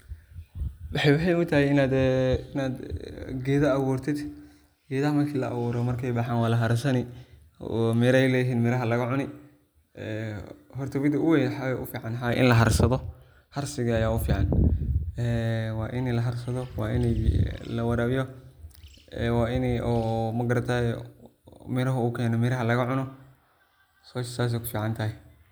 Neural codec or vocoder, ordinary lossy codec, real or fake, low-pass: none; none; real; none